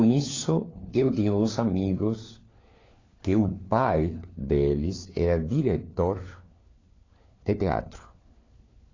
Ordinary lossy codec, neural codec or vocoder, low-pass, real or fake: AAC, 32 kbps; codec, 16 kHz, 4 kbps, FunCodec, trained on LibriTTS, 50 frames a second; 7.2 kHz; fake